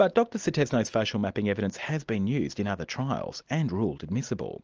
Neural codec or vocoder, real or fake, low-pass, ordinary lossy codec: none; real; 7.2 kHz; Opus, 24 kbps